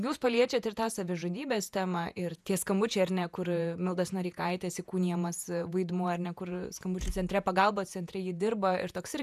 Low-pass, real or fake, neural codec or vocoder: 14.4 kHz; fake; vocoder, 48 kHz, 128 mel bands, Vocos